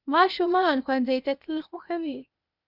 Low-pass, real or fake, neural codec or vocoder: 5.4 kHz; fake; codec, 16 kHz, 0.8 kbps, ZipCodec